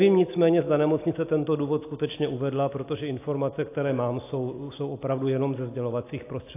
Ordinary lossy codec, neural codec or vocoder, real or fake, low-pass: AAC, 24 kbps; none; real; 3.6 kHz